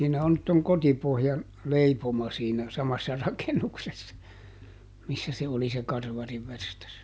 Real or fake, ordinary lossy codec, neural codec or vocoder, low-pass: real; none; none; none